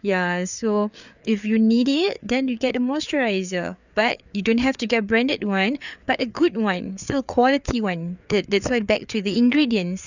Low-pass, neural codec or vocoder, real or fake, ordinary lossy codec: 7.2 kHz; codec, 16 kHz, 4 kbps, FreqCodec, larger model; fake; none